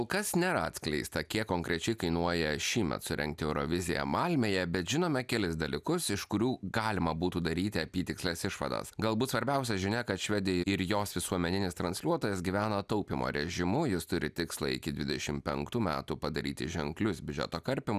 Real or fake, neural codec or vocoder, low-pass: real; none; 14.4 kHz